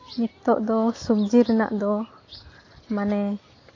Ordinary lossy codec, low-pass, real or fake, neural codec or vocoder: AAC, 32 kbps; 7.2 kHz; real; none